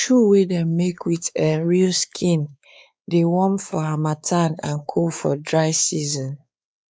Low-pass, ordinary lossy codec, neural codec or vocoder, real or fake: none; none; codec, 16 kHz, 4 kbps, X-Codec, WavLM features, trained on Multilingual LibriSpeech; fake